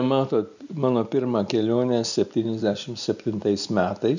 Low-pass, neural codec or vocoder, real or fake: 7.2 kHz; none; real